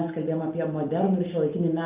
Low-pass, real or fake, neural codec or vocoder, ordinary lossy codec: 3.6 kHz; real; none; Opus, 32 kbps